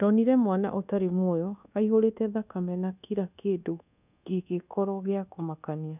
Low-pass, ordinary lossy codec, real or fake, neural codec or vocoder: 3.6 kHz; none; fake; codec, 24 kHz, 1.2 kbps, DualCodec